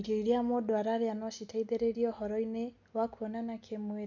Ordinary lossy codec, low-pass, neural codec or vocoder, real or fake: none; 7.2 kHz; none; real